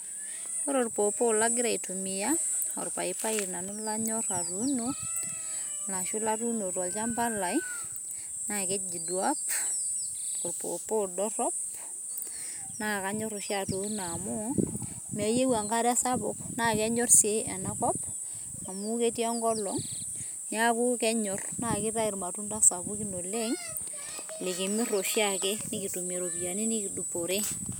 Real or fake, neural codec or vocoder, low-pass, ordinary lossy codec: real; none; none; none